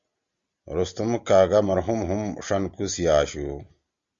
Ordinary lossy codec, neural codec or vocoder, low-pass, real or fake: Opus, 64 kbps; none; 7.2 kHz; real